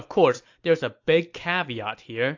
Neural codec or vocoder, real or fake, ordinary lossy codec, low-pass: none; real; AAC, 48 kbps; 7.2 kHz